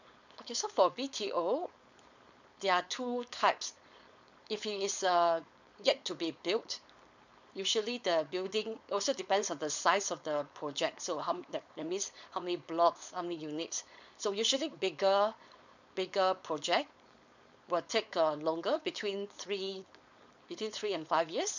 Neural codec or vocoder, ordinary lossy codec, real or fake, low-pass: codec, 16 kHz, 4.8 kbps, FACodec; none; fake; 7.2 kHz